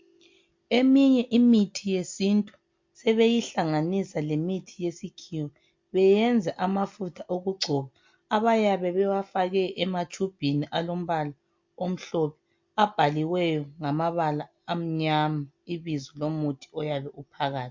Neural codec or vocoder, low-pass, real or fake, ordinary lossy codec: none; 7.2 kHz; real; MP3, 48 kbps